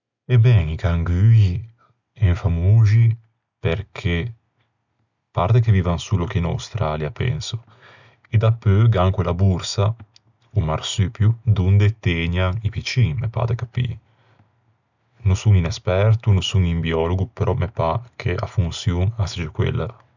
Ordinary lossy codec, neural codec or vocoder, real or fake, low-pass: none; none; real; 7.2 kHz